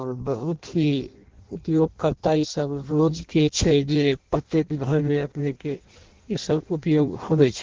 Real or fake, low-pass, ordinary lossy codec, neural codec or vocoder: fake; 7.2 kHz; Opus, 16 kbps; codec, 16 kHz in and 24 kHz out, 0.6 kbps, FireRedTTS-2 codec